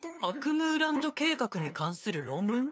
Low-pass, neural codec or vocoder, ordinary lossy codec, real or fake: none; codec, 16 kHz, 2 kbps, FunCodec, trained on LibriTTS, 25 frames a second; none; fake